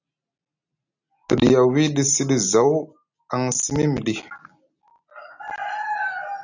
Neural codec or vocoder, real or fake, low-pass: none; real; 7.2 kHz